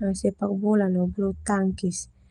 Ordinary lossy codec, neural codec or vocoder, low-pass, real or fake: Opus, 32 kbps; none; 10.8 kHz; real